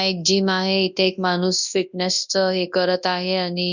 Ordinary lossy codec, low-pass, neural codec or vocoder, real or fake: none; 7.2 kHz; codec, 24 kHz, 0.9 kbps, WavTokenizer, large speech release; fake